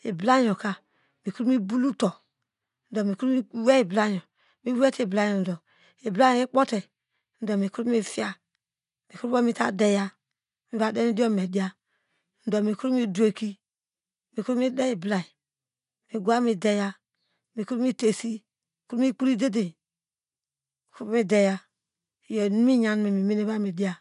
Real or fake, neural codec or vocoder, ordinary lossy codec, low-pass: real; none; none; 10.8 kHz